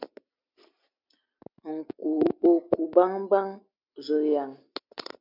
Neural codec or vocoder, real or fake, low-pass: none; real; 5.4 kHz